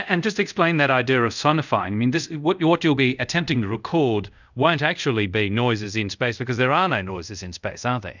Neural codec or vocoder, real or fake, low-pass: codec, 24 kHz, 0.5 kbps, DualCodec; fake; 7.2 kHz